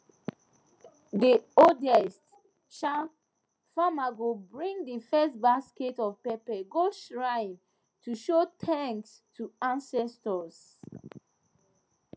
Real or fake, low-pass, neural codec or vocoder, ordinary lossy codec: real; none; none; none